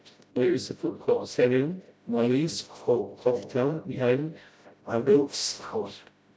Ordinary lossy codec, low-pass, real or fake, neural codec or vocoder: none; none; fake; codec, 16 kHz, 0.5 kbps, FreqCodec, smaller model